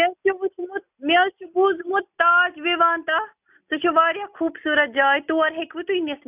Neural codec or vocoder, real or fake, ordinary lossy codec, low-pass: none; real; none; 3.6 kHz